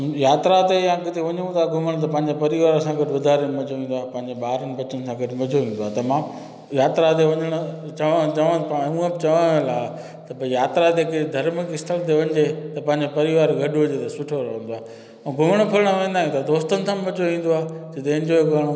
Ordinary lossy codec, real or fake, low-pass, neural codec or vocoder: none; real; none; none